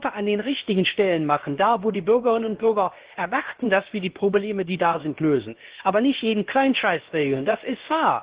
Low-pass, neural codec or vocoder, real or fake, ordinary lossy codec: 3.6 kHz; codec, 16 kHz, about 1 kbps, DyCAST, with the encoder's durations; fake; Opus, 16 kbps